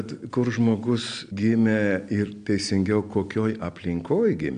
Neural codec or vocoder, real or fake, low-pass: none; real; 9.9 kHz